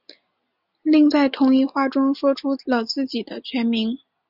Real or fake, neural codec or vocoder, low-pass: real; none; 5.4 kHz